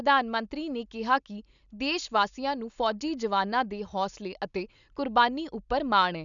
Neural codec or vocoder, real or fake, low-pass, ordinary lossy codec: none; real; 7.2 kHz; none